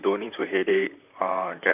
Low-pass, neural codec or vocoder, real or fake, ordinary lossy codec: 3.6 kHz; vocoder, 44.1 kHz, 128 mel bands, Pupu-Vocoder; fake; none